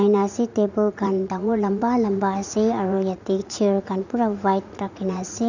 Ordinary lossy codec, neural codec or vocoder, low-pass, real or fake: none; vocoder, 44.1 kHz, 80 mel bands, Vocos; 7.2 kHz; fake